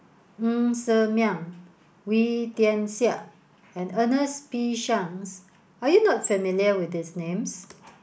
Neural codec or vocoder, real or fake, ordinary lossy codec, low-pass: none; real; none; none